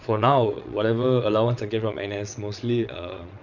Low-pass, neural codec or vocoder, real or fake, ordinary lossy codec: 7.2 kHz; vocoder, 22.05 kHz, 80 mel bands, WaveNeXt; fake; none